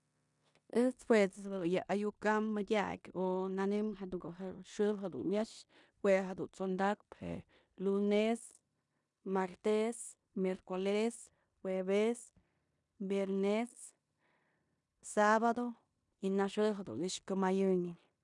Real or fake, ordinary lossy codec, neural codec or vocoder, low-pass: fake; none; codec, 16 kHz in and 24 kHz out, 0.9 kbps, LongCat-Audio-Codec, four codebook decoder; 10.8 kHz